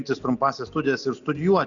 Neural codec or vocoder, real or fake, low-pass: none; real; 7.2 kHz